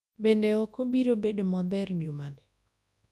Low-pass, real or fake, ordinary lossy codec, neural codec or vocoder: none; fake; none; codec, 24 kHz, 0.9 kbps, WavTokenizer, large speech release